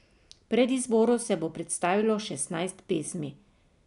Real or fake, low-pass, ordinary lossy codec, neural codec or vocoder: real; 10.8 kHz; none; none